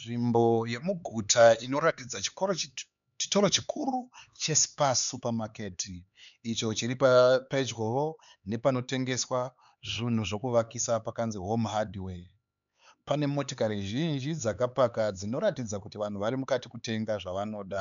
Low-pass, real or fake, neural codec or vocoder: 7.2 kHz; fake; codec, 16 kHz, 4 kbps, X-Codec, HuBERT features, trained on LibriSpeech